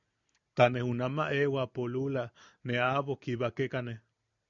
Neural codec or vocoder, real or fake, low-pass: none; real; 7.2 kHz